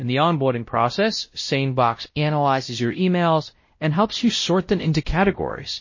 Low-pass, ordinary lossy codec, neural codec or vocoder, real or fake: 7.2 kHz; MP3, 32 kbps; codec, 16 kHz, 0.5 kbps, X-Codec, WavLM features, trained on Multilingual LibriSpeech; fake